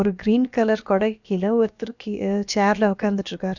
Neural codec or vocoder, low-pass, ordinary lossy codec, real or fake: codec, 16 kHz, about 1 kbps, DyCAST, with the encoder's durations; 7.2 kHz; none; fake